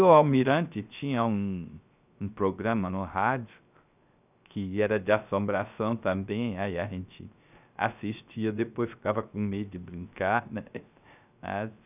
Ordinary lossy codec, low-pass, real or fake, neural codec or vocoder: none; 3.6 kHz; fake; codec, 16 kHz, 0.3 kbps, FocalCodec